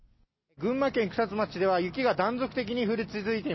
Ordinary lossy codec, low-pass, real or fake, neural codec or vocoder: MP3, 24 kbps; 7.2 kHz; real; none